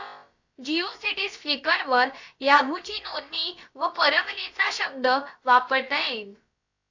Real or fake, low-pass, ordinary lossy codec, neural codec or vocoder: fake; 7.2 kHz; AAC, 48 kbps; codec, 16 kHz, about 1 kbps, DyCAST, with the encoder's durations